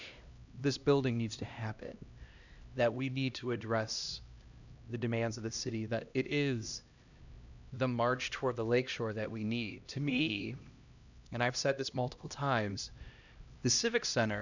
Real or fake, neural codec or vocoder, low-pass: fake; codec, 16 kHz, 1 kbps, X-Codec, HuBERT features, trained on LibriSpeech; 7.2 kHz